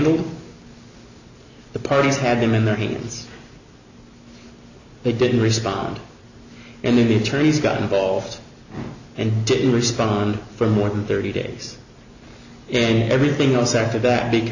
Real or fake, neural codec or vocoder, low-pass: real; none; 7.2 kHz